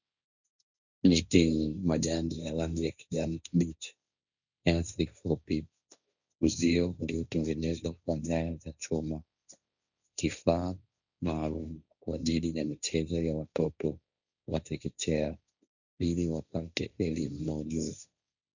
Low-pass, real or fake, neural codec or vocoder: 7.2 kHz; fake; codec, 16 kHz, 1.1 kbps, Voila-Tokenizer